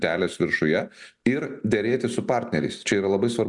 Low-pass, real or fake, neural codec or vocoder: 10.8 kHz; real; none